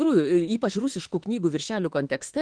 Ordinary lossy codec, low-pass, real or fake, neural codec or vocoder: Opus, 16 kbps; 9.9 kHz; fake; autoencoder, 48 kHz, 32 numbers a frame, DAC-VAE, trained on Japanese speech